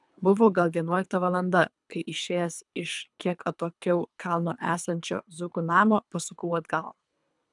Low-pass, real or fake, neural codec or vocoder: 10.8 kHz; fake; codec, 24 kHz, 3 kbps, HILCodec